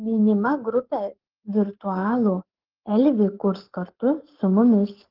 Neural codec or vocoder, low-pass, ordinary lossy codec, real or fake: codec, 44.1 kHz, 7.8 kbps, Pupu-Codec; 5.4 kHz; Opus, 16 kbps; fake